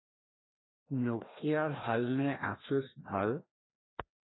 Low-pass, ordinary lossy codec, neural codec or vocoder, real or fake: 7.2 kHz; AAC, 16 kbps; codec, 16 kHz, 1 kbps, FreqCodec, larger model; fake